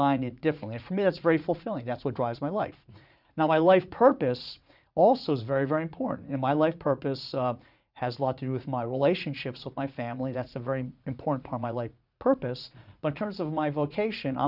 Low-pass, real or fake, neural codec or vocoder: 5.4 kHz; fake; vocoder, 44.1 kHz, 80 mel bands, Vocos